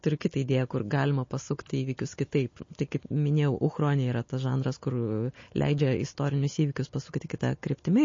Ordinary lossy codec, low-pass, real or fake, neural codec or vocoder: MP3, 32 kbps; 7.2 kHz; real; none